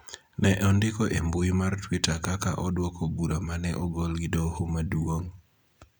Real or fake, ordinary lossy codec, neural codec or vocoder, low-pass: real; none; none; none